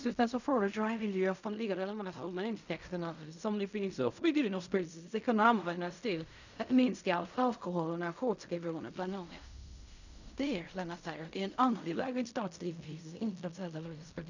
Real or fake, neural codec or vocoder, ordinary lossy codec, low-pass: fake; codec, 16 kHz in and 24 kHz out, 0.4 kbps, LongCat-Audio-Codec, fine tuned four codebook decoder; none; 7.2 kHz